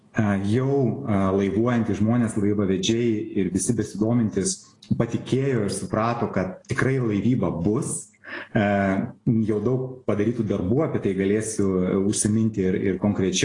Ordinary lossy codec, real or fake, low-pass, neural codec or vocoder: AAC, 32 kbps; real; 10.8 kHz; none